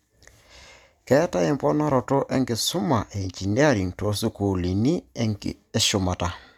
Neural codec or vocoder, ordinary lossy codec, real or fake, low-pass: none; none; real; 19.8 kHz